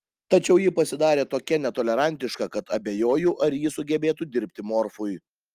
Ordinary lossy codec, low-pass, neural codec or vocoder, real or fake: Opus, 32 kbps; 14.4 kHz; none; real